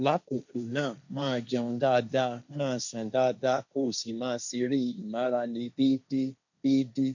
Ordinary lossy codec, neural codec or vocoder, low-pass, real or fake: none; codec, 16 kHz, 1.1 kbps, Voila-Tokenizer; 7.2 kHz; fake